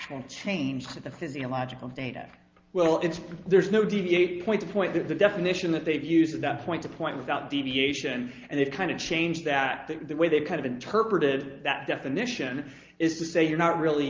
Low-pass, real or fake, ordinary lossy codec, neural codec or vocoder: 7.2 kHz; real; Opus, 24 kbps; none